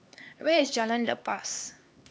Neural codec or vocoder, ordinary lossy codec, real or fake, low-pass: codec, 16 kHz, 2 kbps, X-Codec, HuBERT features, trained on LibriSpeech; none; fake; none